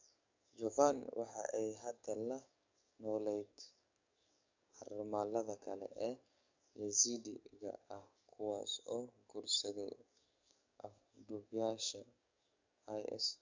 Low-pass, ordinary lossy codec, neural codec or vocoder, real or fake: 7.2 kHz; MP3, 64 kbps; codec, 44.1 kHz, 7.8 kbps, DAC; fake